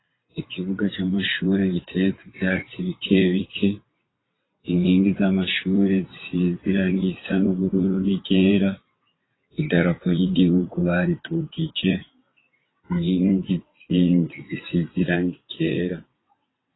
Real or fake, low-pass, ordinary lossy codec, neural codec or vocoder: fake; 7.2 kHz; AAC, 16 kbps; vocoder, 22.05 kHz, 80 mel bands, WaveNeXt